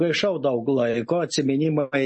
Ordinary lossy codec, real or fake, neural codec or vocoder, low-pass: MP3, 32 kbps; real; none; 10.8 kHz